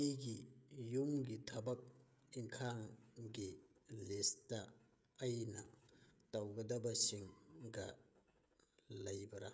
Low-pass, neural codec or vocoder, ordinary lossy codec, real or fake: none; codec, 16 kHz, 16 kbps, FreqCodec, smaller model; none; fake